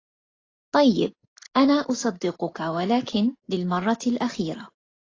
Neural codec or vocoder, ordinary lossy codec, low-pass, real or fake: none; AAC, 32 kbps; 7.2 kHz; real